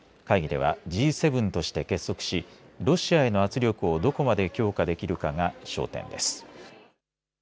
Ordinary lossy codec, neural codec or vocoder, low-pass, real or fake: none; none; none; real